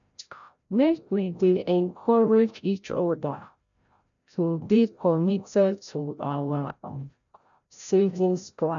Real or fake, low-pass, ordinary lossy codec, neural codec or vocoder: fake; 7.2 kHz; none; codec, 16 kHz, 0.5 kbps, FreqCodec, larger model